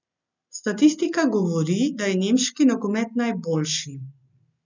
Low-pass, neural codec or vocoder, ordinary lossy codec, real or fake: 7.2 kHz; none; none; real